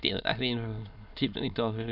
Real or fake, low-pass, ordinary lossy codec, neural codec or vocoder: fake; 5.4 kHz; none; autoencoder, 22.05 kHz, a latent of 192 numbers a frame, VITS, trained on many speakers